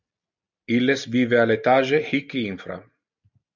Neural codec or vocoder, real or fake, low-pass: none; real; 7.2 kHz